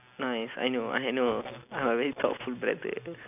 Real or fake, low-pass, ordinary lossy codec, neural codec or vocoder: real; 3.6 kHz; none; none